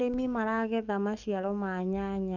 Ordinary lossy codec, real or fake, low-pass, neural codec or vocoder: none; fake; 7.2 kHz; codec, 44.1 kHz, 7.8 kbps, DAC